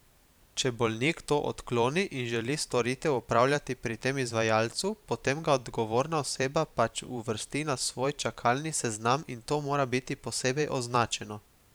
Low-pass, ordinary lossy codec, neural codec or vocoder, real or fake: none; none; vocoder, 44.1 kHz, 128 mel bands every 512 samples, BigVGAN v2; fake